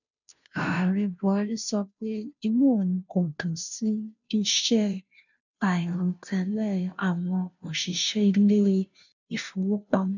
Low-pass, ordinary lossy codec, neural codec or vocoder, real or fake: 7.2 kHz; none; codec, 16 kHz, 0.5 kbps, FunCodec, trained on Chinese and English, 25 frames a second; fake